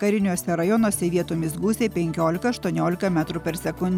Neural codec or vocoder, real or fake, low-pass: none; real; 19.8 kHz